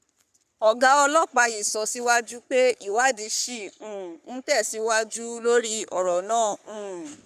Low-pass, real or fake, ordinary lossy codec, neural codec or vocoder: 14.4 kHz; fake; none; codec, 44.1 kHz, 3.4 kbps, Pupu-Codec